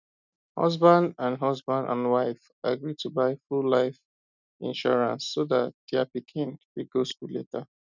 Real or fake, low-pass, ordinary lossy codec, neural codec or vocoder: real; 7.2 kHz; none; none